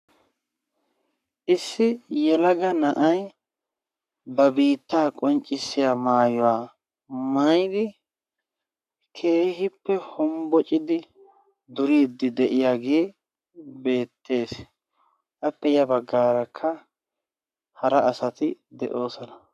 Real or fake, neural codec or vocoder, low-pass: fake; codec, 44.1 kHz, 7.8 kbps, Pupu-Codec; 14.4 kHz